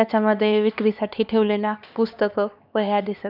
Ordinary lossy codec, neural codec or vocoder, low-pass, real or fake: none; codec, 16 kHz, 2 kbps, X-Codec, HuBERT features, trained on LibriSpeech; 5.4 kHz; fake